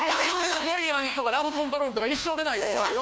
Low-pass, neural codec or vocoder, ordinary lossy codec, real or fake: none; codec, 16 kHz, 1 kbps, FunCodec, trained on LibriTTS, 50 frames a second; none; fake